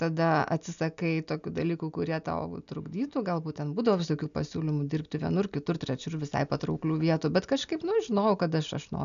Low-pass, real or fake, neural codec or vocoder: 7.2 kHz; real; none